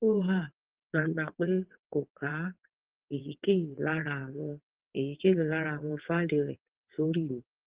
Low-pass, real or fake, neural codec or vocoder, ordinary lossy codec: 3.6 kHz; fake; vocoder, 22.05 kHz, 80 mel bands, WaveNeXt; Opus, 16 kbps